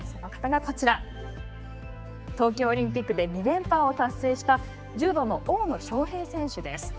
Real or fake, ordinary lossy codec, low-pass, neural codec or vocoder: fake; none; none; codec, 16 kHz, 4 kbps, X-Codec, HuBERT features, trained on general audio